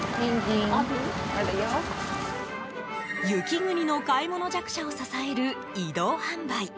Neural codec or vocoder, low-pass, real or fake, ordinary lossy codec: none; none; real; none